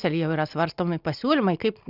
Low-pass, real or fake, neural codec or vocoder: 5.4 kHz; real; none